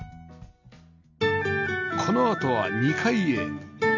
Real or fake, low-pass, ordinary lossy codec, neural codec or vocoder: real; 7.2 kHz; none; none